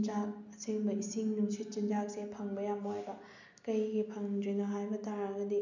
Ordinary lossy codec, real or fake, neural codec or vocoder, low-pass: none; real; none; 7.2 kHz